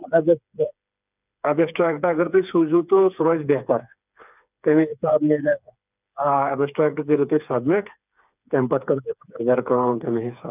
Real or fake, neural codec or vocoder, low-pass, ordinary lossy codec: fake; codec, 16 kHz, 4 kbps, FreqCodec, smaller model; 3.6 kHz; none